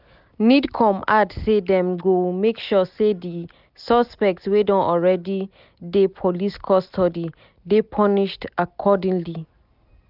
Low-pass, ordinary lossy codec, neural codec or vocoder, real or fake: 5.4 kHz; none; none; real